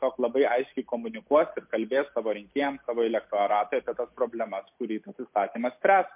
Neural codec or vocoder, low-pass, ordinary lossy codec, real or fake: none; 3.6 kHz; MP3, 32 kbps; real